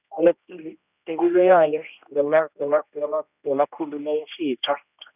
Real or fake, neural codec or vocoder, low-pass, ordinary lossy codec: fake; codec, 16 kHz, 1 kbps, X-Codec, HuBERT features, trained on general audio; 3.6 kHz; none